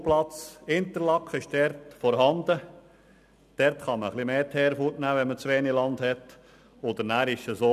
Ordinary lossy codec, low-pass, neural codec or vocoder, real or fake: none; 14.4 kHz; none; real